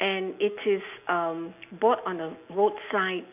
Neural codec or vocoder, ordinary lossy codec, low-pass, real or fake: none; none; 3.6 kHz; real